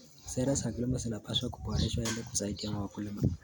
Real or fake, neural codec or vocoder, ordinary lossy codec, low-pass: real; none; none; none